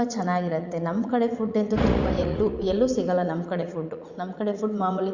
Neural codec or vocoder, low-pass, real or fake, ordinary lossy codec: vocoder, 44.1 kHz, 80 mel bands, Vocos; 7.2 kHz; fake; Opus, 64 kbps